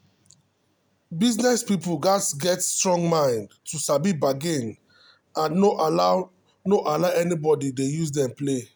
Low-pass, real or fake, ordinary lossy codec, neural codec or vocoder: none; real; none; none